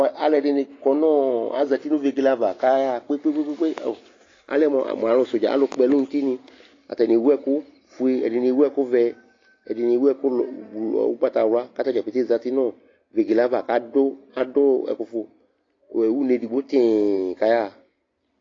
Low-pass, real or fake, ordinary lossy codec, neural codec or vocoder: 7.2 kHz; real; AAC, 32 kbps; none